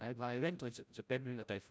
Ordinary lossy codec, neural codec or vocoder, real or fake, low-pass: none; codec, 16 kHz, 0.5 kbps, FreqCodec, larger model; fake; none